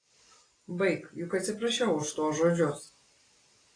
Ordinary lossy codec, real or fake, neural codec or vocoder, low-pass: AAC, 32 kbps; real; none; 9.9 kHz